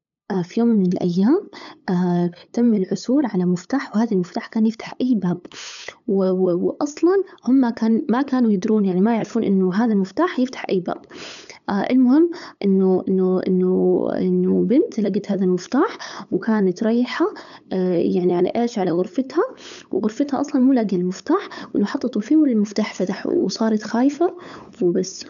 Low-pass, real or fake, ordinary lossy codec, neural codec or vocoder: 7.2 kHz; fake; none; codec, 16 kHz, 8 kbps, FunCodec, trained on LibriTTS, 25 frames a second